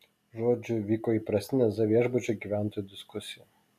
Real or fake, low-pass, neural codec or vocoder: real; 14.4 kHz; none